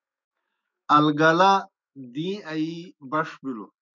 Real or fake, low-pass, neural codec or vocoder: fake; 7.2 kHz; autoencoder, 48 kHz, 128 numbers a frame, DAC-VAE, trained on Japanese speech